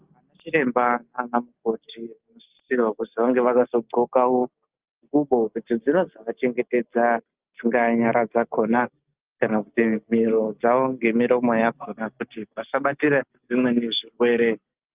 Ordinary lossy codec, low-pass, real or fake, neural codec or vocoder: Opus, 32 kbps; 3.6 kHz; real; none